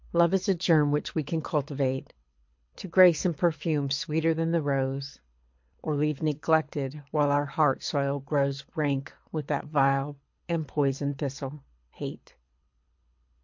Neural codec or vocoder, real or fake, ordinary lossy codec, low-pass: codec, 24 kHz, 6 kbps, HILCodec; fake; MP3, 48 kbps; 7.2 kHz